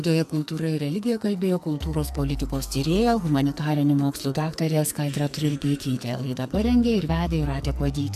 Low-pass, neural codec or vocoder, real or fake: 14.4 kHz; codec, 44.1 kHz, 2.6 kbps, SNAC; fake